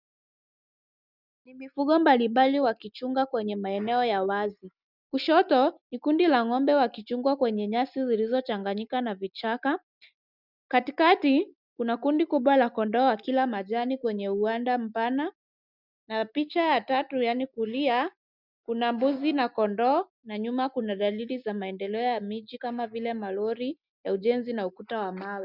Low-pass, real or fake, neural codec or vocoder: 5.4 kHz; real; none